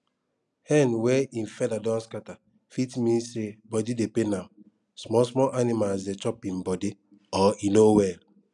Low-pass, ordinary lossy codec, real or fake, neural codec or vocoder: 10.8 kHz; none; real; none